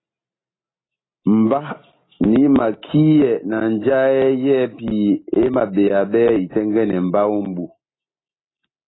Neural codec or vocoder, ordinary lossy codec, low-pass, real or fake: none; AAC, 16 kbps; 7.2 kHz; real